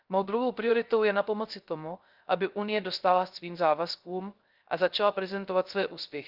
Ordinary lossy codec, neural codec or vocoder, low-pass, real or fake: Opus, 24 kbps; codec, 16 kHz, 0.3 kbps, FocalCodec; 5.4 kHz; fake